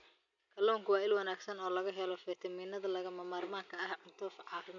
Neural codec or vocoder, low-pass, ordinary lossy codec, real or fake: none; 7.2 kHz; none; real